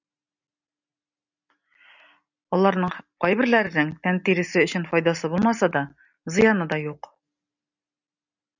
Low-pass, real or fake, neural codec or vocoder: 7.2 kHz; real; none